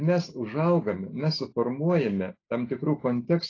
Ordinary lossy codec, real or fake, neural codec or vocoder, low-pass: AAC, 32 kbps; real; none; 7.2 kHz